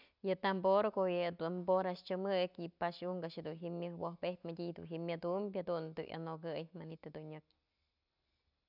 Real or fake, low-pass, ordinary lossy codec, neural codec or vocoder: real; 5.4 kHz; none; none